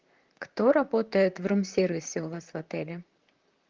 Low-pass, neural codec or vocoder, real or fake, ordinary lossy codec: 7.2 kHz; vocoder, 44.1 kHz, 128 mel bands, Pupu-Vocoder; fake; Opus, 32 kbps